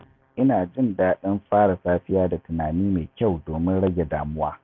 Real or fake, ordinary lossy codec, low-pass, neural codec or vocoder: real; none; 7.2 kHz; none